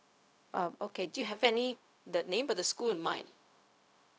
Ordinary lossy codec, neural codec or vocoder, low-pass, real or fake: none; codec, 16 kHz, 0.4 kbps, LongCat-Audio-Codec; none; fake